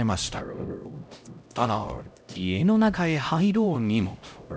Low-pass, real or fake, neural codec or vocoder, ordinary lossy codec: none; fake; codec, 16 kHz, 0.5 kbps, X-Codec, HuBERT features, trained on LibriSpeech; none